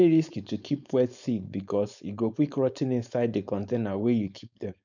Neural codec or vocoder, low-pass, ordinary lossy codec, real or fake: codec, 16 kHz, 4.8 kbps, FACodec; 7.2 kHz; none; fake